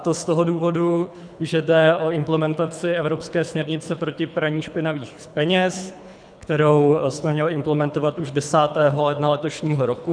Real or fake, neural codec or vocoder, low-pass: fake; codec, 24 kHz, 3 kbps, HILCodec; 9.9 kHz